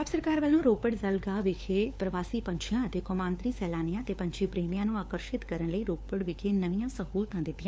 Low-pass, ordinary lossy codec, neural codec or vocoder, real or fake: none; none; codec, 16 kHz, 4 kbps, FunCodec, trained on LibriTTS, 50 frames a second; fake